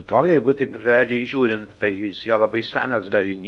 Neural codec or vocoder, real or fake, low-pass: codec, 16 kHz in and 24 kHz out, 0.6 kbps, FocalCodec, streaming, 4096 codes; fake; 10.8 kHz